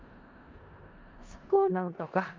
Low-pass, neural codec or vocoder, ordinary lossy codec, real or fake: 7.2 kHz; codec, 16 kHz in and 24 kHz out, 0.4 kbps, LongCat-Audio-Codec, four codebook decoder; Opus, 24 kbps; fake